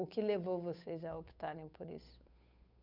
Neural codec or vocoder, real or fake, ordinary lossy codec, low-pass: codec, 16 kHz, 8 kbps, FunCodec, trained on Chinese and English, 25 frames a second; fake; none; 5.4 kHz